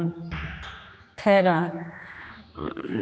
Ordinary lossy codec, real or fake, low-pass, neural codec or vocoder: none; fake; none; codec, 16 kHz, 2 kbps, X-Codec, HuBERT features, trained on general audio